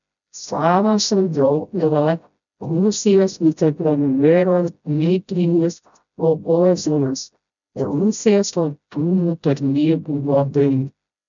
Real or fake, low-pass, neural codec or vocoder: fake; 7.2 kHz; codec, 16 kHz, 0.5 kbps, FreqCodec, smaller model